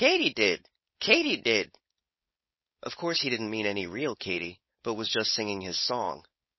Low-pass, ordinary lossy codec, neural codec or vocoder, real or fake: 7.2 kHz; MP3, 24 kbps; codec, 16 kHz, 4.8 kbps, FACodec; fake